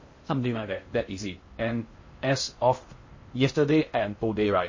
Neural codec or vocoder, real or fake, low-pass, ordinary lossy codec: codec, 16 kHz in and 24 kHz out, 0.6 kbps, FocalCodec, streaming, 2048 codes; fake; 7.2 kHz; MP3, 32 kbps